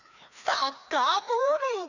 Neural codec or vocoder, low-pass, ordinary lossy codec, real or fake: codec, 16 kHz, 2 kbps, FreqCodec, larger model; 7.2 kHz; none; fake